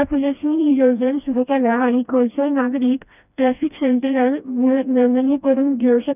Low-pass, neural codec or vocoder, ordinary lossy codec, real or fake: 3.6 kHz; codec, 16 kHz, 1 kbps, FreqCodec, smaller model; none; fake